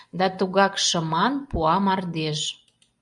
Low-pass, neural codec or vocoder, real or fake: 10.8 kHz; none; real